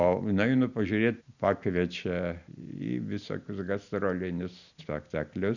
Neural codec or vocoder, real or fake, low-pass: none; real; 7.2 kHz